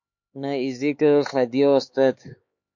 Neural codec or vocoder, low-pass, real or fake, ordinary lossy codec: codec, 16 kHz, 4 kbps, X-Codec, HuBERT features, trained on LibriSpeech; 7.2 kHz; fake; MP3, 48 kbps